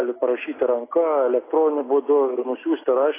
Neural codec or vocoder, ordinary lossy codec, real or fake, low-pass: none; AAC, 16 kbps; real; 3.6 kHz